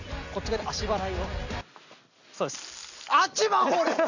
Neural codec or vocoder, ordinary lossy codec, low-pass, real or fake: none; none; 7.2 kHz; real